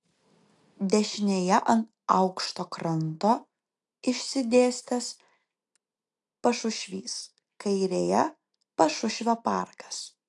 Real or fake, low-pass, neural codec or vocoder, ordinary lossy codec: real; 10.8 kHz; none; AAC, 64 kbps